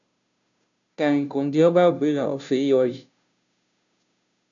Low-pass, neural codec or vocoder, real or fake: 7.2 kHz; codec, 16 kHz, 0.5 kbps, FunCodec, trained on Chinese and English, 25 frames a second; fake